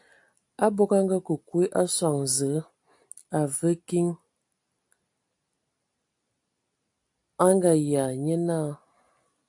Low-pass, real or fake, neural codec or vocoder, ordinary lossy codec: 10.8 kHz; real; none; AAC, 64 kbps